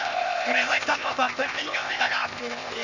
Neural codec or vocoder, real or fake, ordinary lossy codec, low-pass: codec, 16 kHz, 0.8 kbps, ZipCodec; fake; none; 7.2 kHz